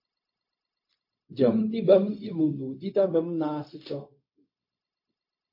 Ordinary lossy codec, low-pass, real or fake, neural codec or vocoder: MP3, 32 kbps; 5.4 kHz; fake; codec, 16 kHz, 0.4 kbps, LongCat-Audio-Codec